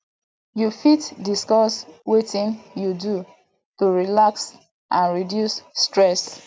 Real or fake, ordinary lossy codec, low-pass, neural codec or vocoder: real; none; none; none